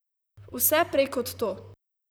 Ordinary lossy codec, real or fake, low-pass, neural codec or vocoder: none; fake; none; vocoder, 44.1 kHz, 128 mel bands, Pupu-Vocoder